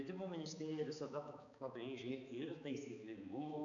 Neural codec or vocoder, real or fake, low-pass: codec, 16 kHz, 4 kbps, X-Codec, HuBERT features, trained on balanced general audio; fake; 7.2 kHz